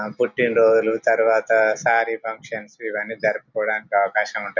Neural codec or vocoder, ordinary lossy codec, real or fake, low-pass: none; none; real; 7.2 kHz